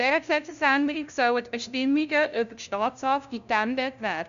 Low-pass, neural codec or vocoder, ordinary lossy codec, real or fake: 7.2 kHz; codec, 16 kHz, 0.5 kbps, FunCodec, trained on LibriTTS, 25 frames a second; none; fake